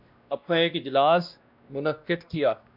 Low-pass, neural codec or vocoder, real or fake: 5.4 kHz; codec, 16 kHz, 1 kbps, X-Codec, WavLM features, trained on Multilingual LibriSpeech; fake